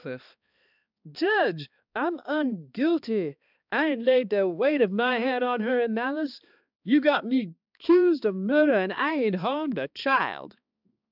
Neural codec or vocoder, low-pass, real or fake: codec, 16 kHz, 2 kbps, X-Codec, HuBERT features, trained on balanced general audio; 5.4 kHz; fake